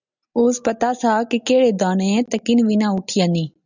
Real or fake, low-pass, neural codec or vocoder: real; 7.2 kHz; none